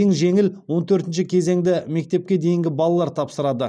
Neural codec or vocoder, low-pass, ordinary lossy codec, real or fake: none; none; none; real